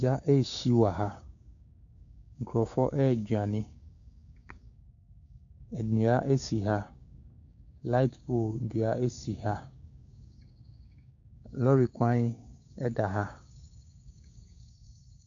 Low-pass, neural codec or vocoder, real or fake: 7.2 kHz; codec, 16 kHz, 6 kbps, DAC; fake